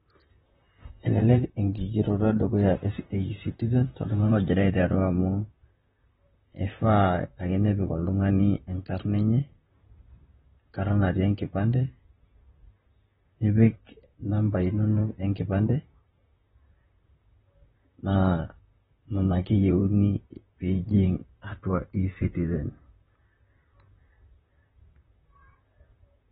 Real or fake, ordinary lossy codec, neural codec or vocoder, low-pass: fake; AAC, 16 kbps; vocoder, 44.1 kHz, 128 mel bands, Pupu-Vocoder; 19.8 kHz